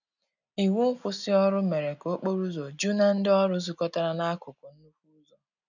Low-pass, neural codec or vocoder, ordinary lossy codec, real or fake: 7.2 kHz; none; none; real